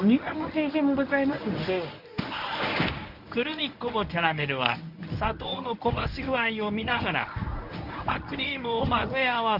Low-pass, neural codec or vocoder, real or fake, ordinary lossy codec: 5.4 kHz; codec, 24 kHz, 0.9 kbps, WavTokenizer, medium speech release version 1; fake; none